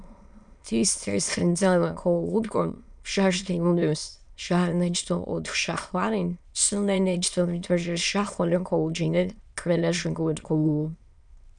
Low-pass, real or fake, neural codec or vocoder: 9.9 kHz; fake; autoencoder, 22.05 kHz, a latent of 192 numbers a frame, VITS, trained on many speakers